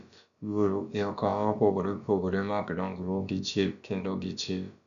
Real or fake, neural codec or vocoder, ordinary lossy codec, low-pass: fake; codec, 16 kHz, about 1 kbps, DyCAST, with the encoder's durations; none; 7.2 kHz